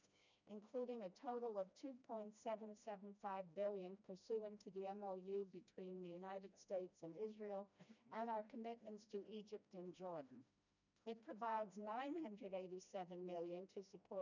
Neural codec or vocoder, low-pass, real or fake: codec, 16 kHz, 1 kbps, FreqCodec, smaller model; 7.2 kHz; fake